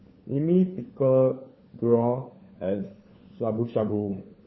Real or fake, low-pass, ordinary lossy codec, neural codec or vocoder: fake; 7.2 kHz; MP3, 24 kbps; codec, 16 kHz, 4 kbps, FunCodec, trained on LibriTTS, 50 frames a second